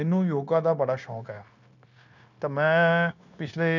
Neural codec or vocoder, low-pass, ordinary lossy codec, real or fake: codec, 16 kHz, 0.9 kbps, LongCat-Audio-Codec; 7.2 kHz; none; fake